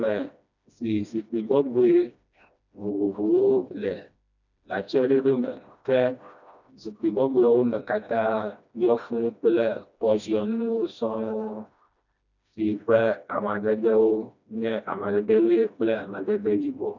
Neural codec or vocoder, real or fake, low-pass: codec, 16 kHz, 1 kbps, FreqCodec, smaller model; fake; 7.2 kHz